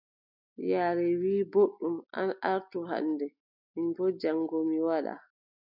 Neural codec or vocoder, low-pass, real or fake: none; 5.4 kHz; real